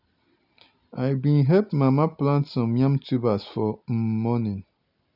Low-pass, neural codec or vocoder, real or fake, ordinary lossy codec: 5.4 kHz; none; real; none